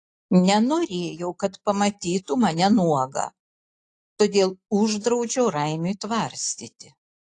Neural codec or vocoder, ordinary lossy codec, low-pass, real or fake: none; AAC, 48 kbps; 10.8 kHz; real